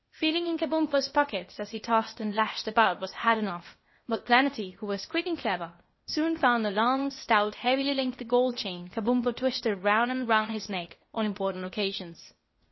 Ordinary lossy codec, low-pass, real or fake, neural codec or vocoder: MP3, 24 kbps; 7.2 kHz; fake; codec, 16 kHz, 0.8 kbps, ZipCodec